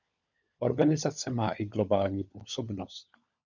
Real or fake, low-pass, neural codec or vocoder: fake; 7.2 kHz; codec, 16 kHz, 16 kbps, FunCodec, trained on LibriTTS, 50 frames a second